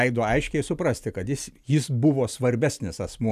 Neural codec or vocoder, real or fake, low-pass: none; real; 14.4 kHz